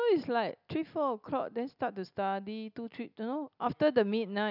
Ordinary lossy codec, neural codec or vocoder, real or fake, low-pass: none; none; real; 5.4 kHz